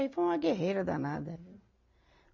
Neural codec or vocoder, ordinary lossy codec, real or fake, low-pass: none; AAC, 48 kbps; real; 7.2 kHz